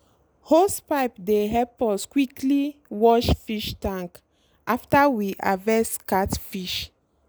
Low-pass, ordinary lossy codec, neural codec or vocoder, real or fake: none; none; none; real